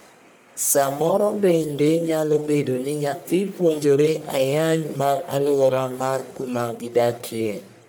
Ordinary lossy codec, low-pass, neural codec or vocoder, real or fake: none; none; codec, 44.1 kHz, 1.7 kbps, Pupu-Codec; fake